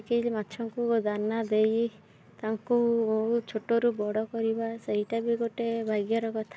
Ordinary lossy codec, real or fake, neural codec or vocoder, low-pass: none; real; none; none